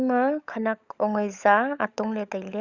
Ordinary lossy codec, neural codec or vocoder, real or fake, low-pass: Opus, 64 kbps; codec, 16 kHz, 16 kbps, FunCodec, trained on LibriTTS, 50 frames a second; fake; 7.2 kHz